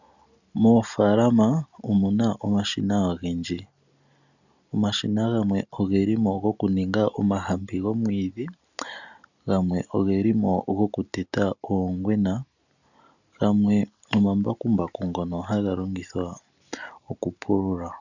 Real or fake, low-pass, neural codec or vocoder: real; 7.2 kHz; none